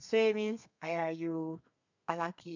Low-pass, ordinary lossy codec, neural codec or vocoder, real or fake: 7.2 kHz; none; codec, 32 kHz, 1.9 kbps, SNAC; fake